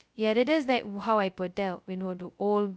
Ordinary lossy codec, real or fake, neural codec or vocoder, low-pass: none; fake; codec, 16 kHz, 0.2 kbps, FocalCodec; none